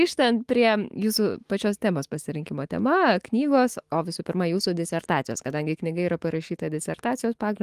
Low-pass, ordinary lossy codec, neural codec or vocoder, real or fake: 14.4 kHz; Opus, 24 kbps; autoencoder, 48 kHz, 128 numbers a frame, DAC-VAE, trained on Japanese speech; fake